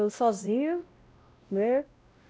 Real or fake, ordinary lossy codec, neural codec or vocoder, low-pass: fake; none; codec, 16 kHz, 0.5 kbps, X-Codec, WavLM features, trained on Multilingual LibriSpeech; none